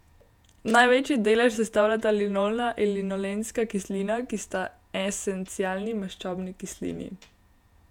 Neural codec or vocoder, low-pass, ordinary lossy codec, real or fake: vocoder, 48 kHz, 128 mel bands, Vocos; 19.8 kHz; none; fake